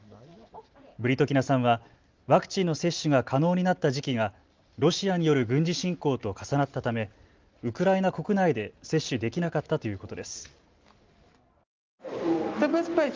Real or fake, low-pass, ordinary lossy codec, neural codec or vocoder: real; 7.2 kHz; Opus, 32 kbps; none